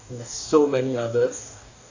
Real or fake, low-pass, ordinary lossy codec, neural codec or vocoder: fake; 7.2 kHz; AAC, 48 kbps; codec, 44.1 kHz, 2.6 kbps, DAC